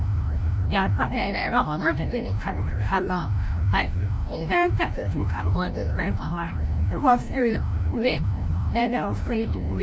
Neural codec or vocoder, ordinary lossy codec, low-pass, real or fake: codec, 16 kHz, 0.5 kbps, FreqCodec, larger model; none; none; fake